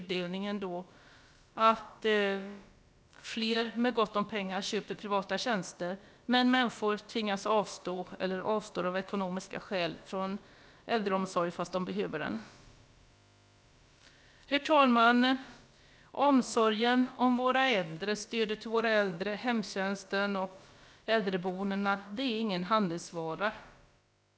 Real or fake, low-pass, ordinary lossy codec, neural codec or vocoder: fake; none; none; codec, 16 kHz, about 1 kbps, DyCAST, with the encoder's durations